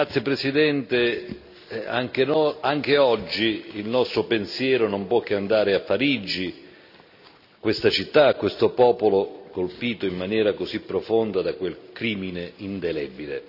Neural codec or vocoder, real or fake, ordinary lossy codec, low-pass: none; real; none; 5.4 kHz